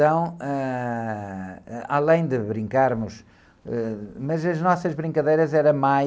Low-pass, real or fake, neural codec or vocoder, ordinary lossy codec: none; real; none; none